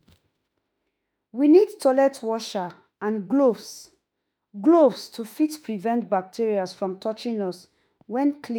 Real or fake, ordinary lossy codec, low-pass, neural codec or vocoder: fake; none; none; autoencoder, 48 kHz, 32 numbers a frame, DAC-VAE, trained on Japanese speech